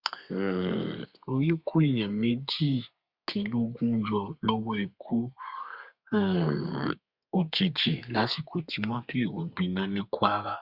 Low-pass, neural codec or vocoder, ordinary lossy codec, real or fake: 5.4 kHz; codec, 32 kHz, 1.9 kbps, SNAC; Opus, 64 kbps; fake